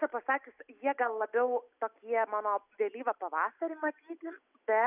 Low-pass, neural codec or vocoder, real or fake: 3.6 kHz; none; real